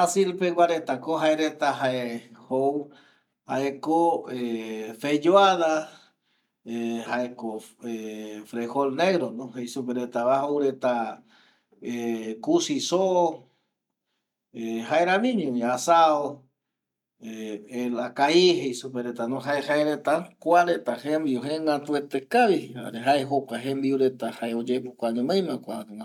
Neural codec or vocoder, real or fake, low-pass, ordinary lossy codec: none; real; 19.8 kHz; none